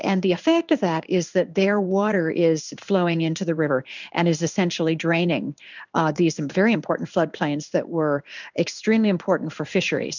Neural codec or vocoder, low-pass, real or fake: codec, 16 kHz in and 24 kHz out, 1 kbps, XY-Tokenizer; 7.2 kHz; fake